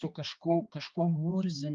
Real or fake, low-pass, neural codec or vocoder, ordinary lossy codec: fake; 7.2 kHz; codec, 16 kHz, 2 kbps, X-Codec, HuBERT features, trained on balanced general audio; Opus, 24 kbps